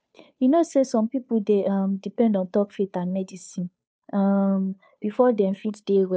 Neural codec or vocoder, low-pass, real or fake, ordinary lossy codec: codec, 16 kHz, 2 kbps, FunCodec, trained on Chinese and English, 25 frames a second; none; fake; none